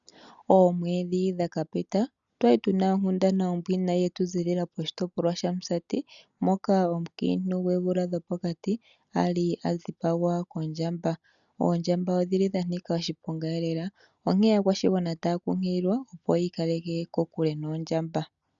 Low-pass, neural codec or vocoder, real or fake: 7.2 kHz; none; real